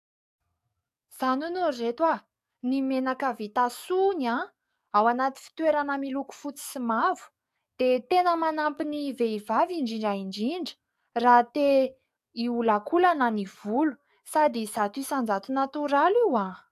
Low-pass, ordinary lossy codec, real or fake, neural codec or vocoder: 14.4 kHz; AAC, 96 kbps; fake; codec, 44.1 kHz, 7.8 kbps, DAC